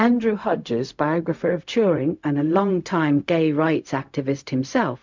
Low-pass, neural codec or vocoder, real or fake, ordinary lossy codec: 7.2 kHz; codec, 16 kHz, 0.4 kbps, LongCat-Audio-Codec; fake; MP3, 64 kbps